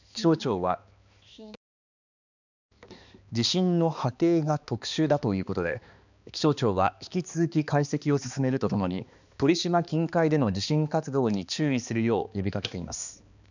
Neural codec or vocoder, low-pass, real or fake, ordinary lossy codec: codec, 16 kHz, 2 kbps, X-Codec, HuBERT features, trained on balanced general audio; 7.2 kHz; fake; none